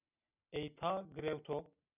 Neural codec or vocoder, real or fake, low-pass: none; real; 3.6 kHz